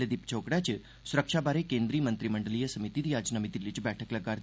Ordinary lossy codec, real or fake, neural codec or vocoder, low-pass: none; real; none; none